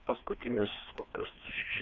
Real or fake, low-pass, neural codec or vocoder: fake; 7.2 kHz; codec, 16 kHz, 2 kbps, FreqCodec, larger model